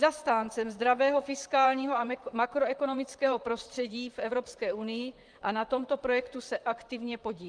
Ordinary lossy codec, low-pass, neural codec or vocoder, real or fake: Opus, 32 kbps; 9.9 kHz; vocoder, 44.1 kHz, 128 mel bands, Pupu-Vocoder; fake